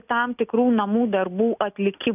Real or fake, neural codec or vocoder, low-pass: real; none; 3.6 kHz